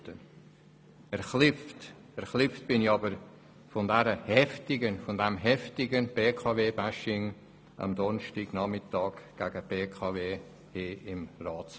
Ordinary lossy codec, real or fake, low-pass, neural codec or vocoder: none; real; none; none